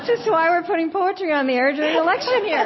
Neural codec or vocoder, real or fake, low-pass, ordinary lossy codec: none; real; 7.2 kHz; MP3, 24 kbps